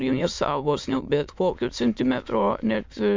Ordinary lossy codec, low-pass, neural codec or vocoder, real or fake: MP3, 64 kbps; 7.2 kHz; autoencoder, 22.05 kHz, a latent of 192 numbers a frame, VITS, trained on many speakers; fake